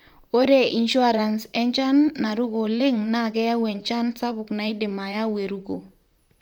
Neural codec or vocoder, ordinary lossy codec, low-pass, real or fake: vocoder, 44.1 kHz, 128 mel bands, Pupu-Vocoder; none; 19.8 kHz; fake